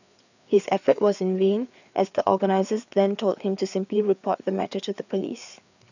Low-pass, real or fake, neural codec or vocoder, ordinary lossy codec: 7.2 kHz; fake; codec, 16 kHz, 4 kbps, FreqCodec, larger model; none